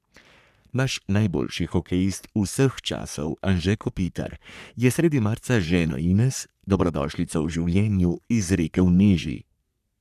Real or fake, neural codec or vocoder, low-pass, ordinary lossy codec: fake; codec, 44.1 kHz, 3.4 kbps, Pupu-Codec; 14.4 kHz; none